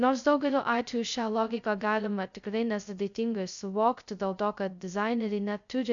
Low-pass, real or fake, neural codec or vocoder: 7.2 kHz; fake; codec, 16 kHz, 0.2 kbps, FocalCodec